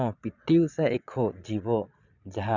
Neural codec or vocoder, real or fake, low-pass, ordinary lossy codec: vocoder, 22.05 kHz, 80 mel bands, Vocos; fake; 7.2 kHz; none